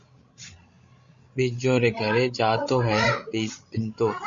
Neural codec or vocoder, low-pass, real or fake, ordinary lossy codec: codec, 16 kHz, 16 kbps, FreqCodec, larger model; 7.2 kHz; fake; Opus, 64 kbps